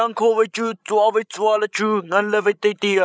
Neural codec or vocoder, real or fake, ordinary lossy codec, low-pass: codec, 16 kHz, 16 kbps, FreqCodec, larger model; fake; none; none